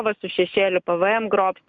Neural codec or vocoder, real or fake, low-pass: none; real; 7.2 kHz